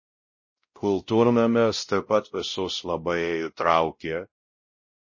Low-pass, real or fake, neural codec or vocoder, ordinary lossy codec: 7.2 kHz; fake; codec, 16 kHz, 0.5 kbps, X-Codec, WavLM features, trained on Multilingual LibriSpeech; MP3, 32 kbps